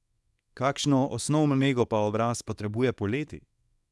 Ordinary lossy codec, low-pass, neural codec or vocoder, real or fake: none; none; codec, 24 kHz, 0.9 kbps, WavTokenizer, small release; fake